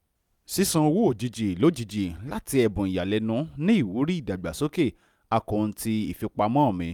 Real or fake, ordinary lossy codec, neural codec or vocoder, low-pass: real; none; none; 19.8 kHz